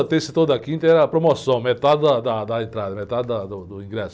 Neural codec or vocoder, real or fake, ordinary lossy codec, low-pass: none; real; none; none